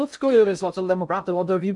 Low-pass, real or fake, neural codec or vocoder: 10.8 kHz; fake; codec, 16 kHz in and 24 kHz out, 0.6 kbps, FocalCodec, streaming, 4096 codes